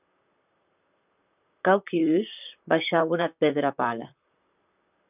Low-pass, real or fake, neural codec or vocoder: 3.6 kHz; fake; vocoder, 44.1 kHz, 128 mel bands, Pupu-Vocoder